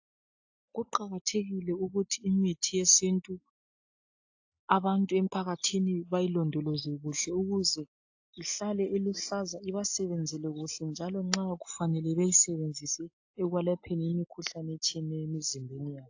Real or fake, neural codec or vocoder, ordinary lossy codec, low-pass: real; none; AAC, 48 kbps; 7.2 kHz